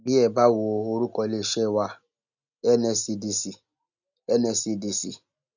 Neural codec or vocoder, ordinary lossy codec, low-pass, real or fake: none; none; 7.2 kHz; real